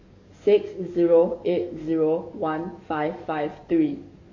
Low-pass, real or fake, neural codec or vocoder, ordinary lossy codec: 7.2 kHz; fake; codec, 44.1 kHz, 7.8 kbps, DAC; MP3, 48 kbps